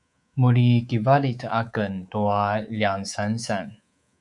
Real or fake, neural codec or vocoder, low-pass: fake; codec, 24 kHz, 3.1 kbps, DualCodec; 10.8 kHz